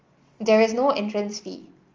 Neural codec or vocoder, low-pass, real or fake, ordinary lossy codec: none; 7.2 kHz; real; Opus, 32 kbps